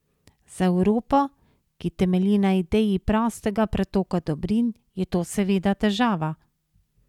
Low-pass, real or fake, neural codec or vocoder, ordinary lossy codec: 19.8 kHz; real; none; none